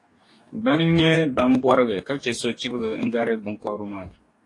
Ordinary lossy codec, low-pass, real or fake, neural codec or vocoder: AAC, 48 kbps; 10.8 kHz; fake; codec, 44.1 kHz, 2.6 kbps, DAC